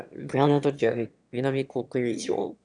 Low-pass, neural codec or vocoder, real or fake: 9.9 kHz; autoencoder, 22.05 kHz, a latent of 192 numbers a frame, VITS, trained on one speaker; fake